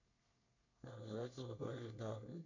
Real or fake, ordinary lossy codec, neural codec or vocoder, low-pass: fake; none; codec, 24 kHz, 1 kbps, SNAC; 7.2 kHz